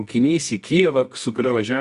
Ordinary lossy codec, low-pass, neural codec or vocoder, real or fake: Opus, 64 kbps; 10.8 kHz; codec, 24 kHz, 0.9 kbps, WavTokenizer, medium music audio release; fake